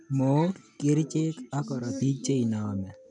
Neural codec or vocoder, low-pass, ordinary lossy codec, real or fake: none; 9.9 kHz; none; real